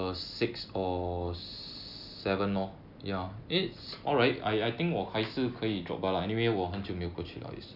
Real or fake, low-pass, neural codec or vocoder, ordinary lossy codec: real; 5.4 kHz; none; none